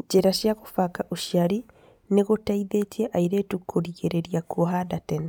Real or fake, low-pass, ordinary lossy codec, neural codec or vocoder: real; 19.8 kHz; none; none